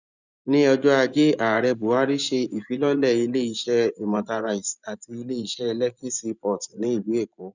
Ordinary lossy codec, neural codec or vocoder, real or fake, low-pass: none; vocoder, 24 kHz, 100 mel bands, Vocos; fake; 7.2 kHz